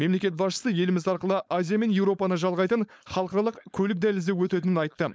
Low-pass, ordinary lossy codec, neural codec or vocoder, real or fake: none; none; codec, 16 kHz, 4.8 kbps, FACodec; fake